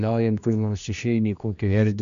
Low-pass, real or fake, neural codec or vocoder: 7.2 kHz; fake; codec, 16 kHz, 1 kbps, X-Codec, HuBERT features, trained on general audio